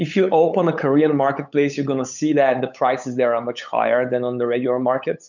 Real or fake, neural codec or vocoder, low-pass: fake; codec, 16 kHz, 8 kbps, FunCodec, trained on LibriTTS, 25 frames a second; 7.2 kHz